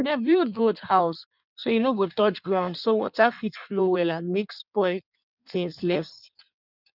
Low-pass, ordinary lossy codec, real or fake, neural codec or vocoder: 5.4 kHz; none; fake; codec, 16 kHz in and 24 kHz out, 1.1 kbps, FireRedTTS-2 codec